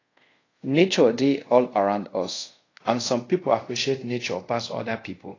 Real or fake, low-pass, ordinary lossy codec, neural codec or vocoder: fake; 7.2 kHz; AAC, 32 kbps; codec, 24 kHz, 0.5 kbps, DualCodec